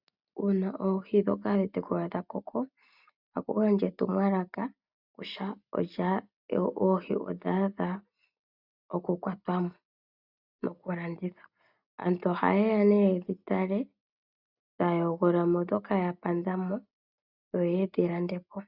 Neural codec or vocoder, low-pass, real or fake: none; 5.4 kHz; real